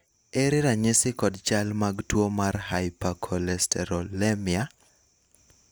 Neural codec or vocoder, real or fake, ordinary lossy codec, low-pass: none; real; none; none